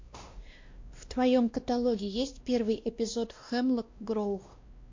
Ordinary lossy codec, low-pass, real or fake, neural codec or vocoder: MP3, 48 kbps; 7.2 kHz; fake; codec, 16 kHz, 1 kbps, X-Codec, WavLM features, trained on Multilingual LibriSpeech